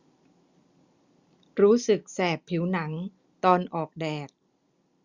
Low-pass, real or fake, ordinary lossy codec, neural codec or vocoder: 7.2 kHz; real; Opus, 64 kbps; none